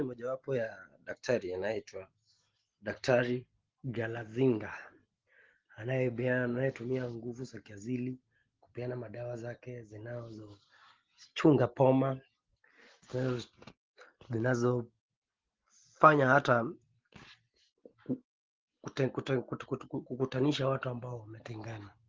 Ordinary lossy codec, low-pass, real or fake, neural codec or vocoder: Opus, 16 kbps; 7.2 kHz; real; none